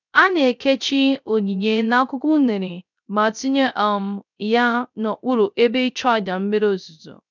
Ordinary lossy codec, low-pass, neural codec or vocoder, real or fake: none; 7.2 kHz; codec, 16 kHz, 0.3 kbps, FocalCodec; fake